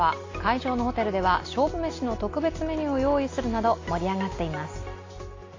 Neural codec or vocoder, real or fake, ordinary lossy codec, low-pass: none; real; AAC, 32 kbps; 7.2 kHz